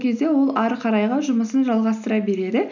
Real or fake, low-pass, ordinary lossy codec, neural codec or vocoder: real; 7.2 kHz; none; none